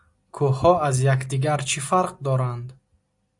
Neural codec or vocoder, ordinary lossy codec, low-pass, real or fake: none; AAC, 64 kbps; 10.8 kHz; real